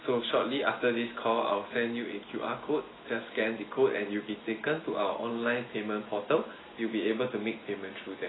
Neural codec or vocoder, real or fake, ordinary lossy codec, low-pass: none; real; AAC, 16 kbps; 7.2 kHz